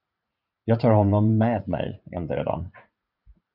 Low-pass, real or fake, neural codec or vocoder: 5.4 kHz; fake; vocoder, 44.1 kHz, 80 mel bands, Vocos